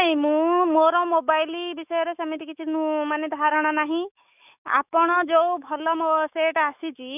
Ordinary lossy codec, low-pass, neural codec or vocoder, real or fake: none; 3.6 kHz; autoencoder, 48 kHz, 128 numbers a frame, DAC-VAE, trained on Japanese speech; fake